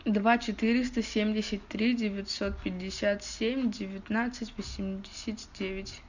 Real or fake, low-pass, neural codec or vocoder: real; 7.2 kHz; none